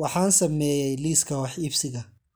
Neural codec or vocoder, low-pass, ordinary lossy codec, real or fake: none; none; none; real